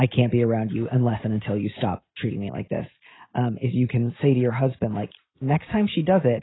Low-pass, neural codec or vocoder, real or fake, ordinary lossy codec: 7.2 kHz; none; real; AAC, 16 kbps